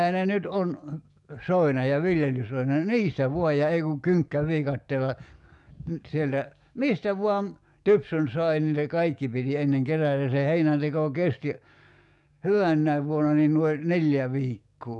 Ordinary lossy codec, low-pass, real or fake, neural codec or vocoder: none; 10.8 kHz; fake; codec, 44.1 kHz, 7.8 kbps, DAC